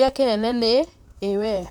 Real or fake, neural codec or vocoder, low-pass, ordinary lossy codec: fake; vocoder, 44.1 kHz, 128 mel bands, Pupu-Vocoder; 19.8 kHz; none